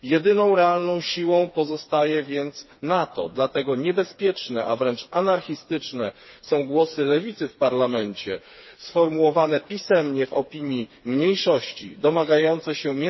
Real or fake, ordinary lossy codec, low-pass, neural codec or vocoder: fake; MP3, 24 kbps; 7.2 kHz; codec, 16 kHz, 4 kbps, FreqCodec, smaller model